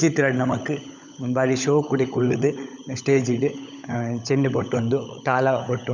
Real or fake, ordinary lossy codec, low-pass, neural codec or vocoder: fake; none; 7.2 kHz; codec, 16 kHz, 16 kbps, FunCodec, trained on LibriTTS, 50 frames a second